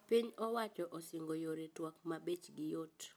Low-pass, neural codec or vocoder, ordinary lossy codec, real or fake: none; none; none; real